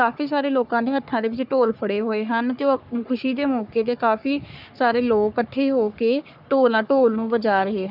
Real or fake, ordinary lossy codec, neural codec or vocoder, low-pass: fake; none; codec, 44.1 kHz, 3.4 kbps, Pupu-Codec; 5.4 kHz